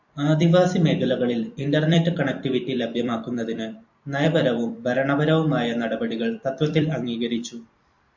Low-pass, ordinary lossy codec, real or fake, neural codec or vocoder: 7.2 kHz; MP3, 48 kbps; fake; vocoder, 44.1 kHz, 128 mel bands every 256 samples, BigVGAN v2